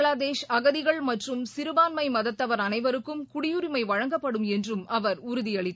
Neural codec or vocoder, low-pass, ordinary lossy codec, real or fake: none; none; none; real